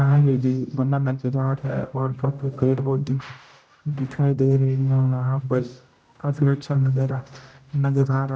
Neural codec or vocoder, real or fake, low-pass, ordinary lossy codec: codec, 16 kHz, 0.5 kbps, X-Codec, HuBERT features, trained on general audio; fake; none; none